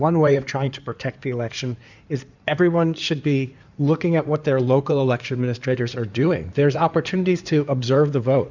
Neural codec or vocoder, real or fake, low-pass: codec, 16 kHz in and 24 kHz out, 2.2 kbps, FireRedTTS-2 codec; fake; 7.2 kHz